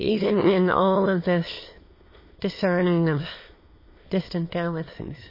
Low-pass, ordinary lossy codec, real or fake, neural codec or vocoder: 5.4 kHz; MP3, 24 kbps; fake; autoencoder, 22.05 kHz, a latent of 192 numbers a frame, VITS, trained on many speakers